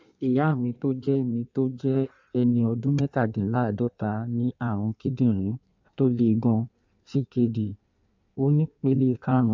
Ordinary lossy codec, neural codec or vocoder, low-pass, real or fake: MP3, 64 kbps; codec, 16 kHz in and 24 kHz out, 1.1 kbps, FireRedTTS-2 codec; 7.2 kHz; fake